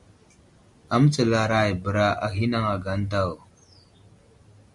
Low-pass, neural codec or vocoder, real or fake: 10.8 kHz; none; real